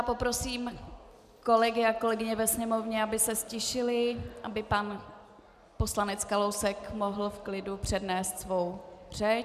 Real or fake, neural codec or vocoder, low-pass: real; none; 14.4 kHz